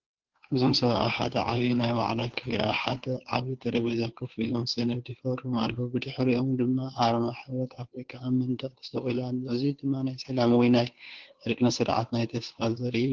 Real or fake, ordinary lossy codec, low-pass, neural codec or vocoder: fake; Opus, 16 kbps; 7.2 kHz; codec, 16 kHz, 2 kbps, FunCodec, trained on Chinese and English, 25 frames a second